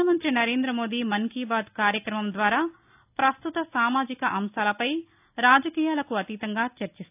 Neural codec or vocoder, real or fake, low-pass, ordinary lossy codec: none; real; 3.6 kHz; AAC, 32 kbps